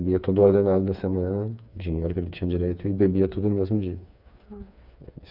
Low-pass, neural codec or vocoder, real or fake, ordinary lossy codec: 5.4 kHz; codec, 16 kHz, 4 kbps, FreqCodec, smaller model; fake; none